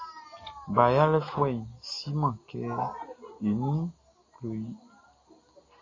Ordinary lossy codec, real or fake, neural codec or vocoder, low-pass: AAC, 32 kbps; real; none; 7.2 kHz